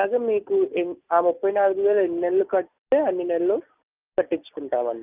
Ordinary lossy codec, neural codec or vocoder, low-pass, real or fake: Opus, 24 kbps; none; 3.6 kHz; real